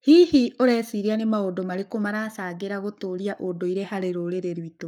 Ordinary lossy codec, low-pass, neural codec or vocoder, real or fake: none; 19.8 kHz; codec, 44.1 kHz, 7.8 kbps, Pupu-Codec; fake